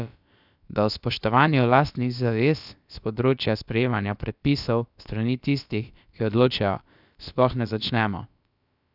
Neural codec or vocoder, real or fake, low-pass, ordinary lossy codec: codec, 16 kHz, about 1 kbps, DyCAST, with the encoder's durations; fake; 5.4 kHz; none